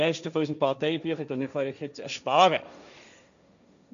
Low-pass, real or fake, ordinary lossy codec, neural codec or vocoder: 7.2 kHz; fake; MP3, 96 kbps; codec, 16 kHz, 1.1 kbps, Voila-Tokenizer